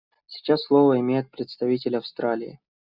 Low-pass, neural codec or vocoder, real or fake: 5.4 kHz; none; real